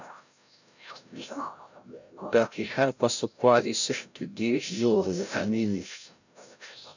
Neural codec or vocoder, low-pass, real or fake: codec, 16 kHz, 0.5 kbps, FreqCodec, larger model; 7.2 kHz; fake